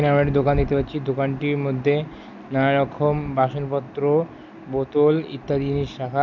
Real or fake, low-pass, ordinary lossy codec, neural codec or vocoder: real; 7.2 kHz; none; none